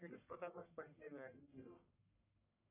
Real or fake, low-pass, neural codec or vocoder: fake; 3.6 kHz; codec, 44.1 kHz, 1.7 kbps, Pupu-Codec